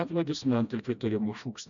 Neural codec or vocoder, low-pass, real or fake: codec, 16 kHz, 1 kbps, FreqCodec, smaller model; 7.2 kHz; fake